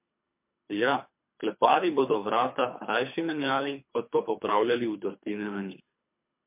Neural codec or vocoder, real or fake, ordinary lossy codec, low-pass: codec, 24 kHz, 3 kbps, HILCodec; fake; MP3, 32 kbps; 3.6 kHz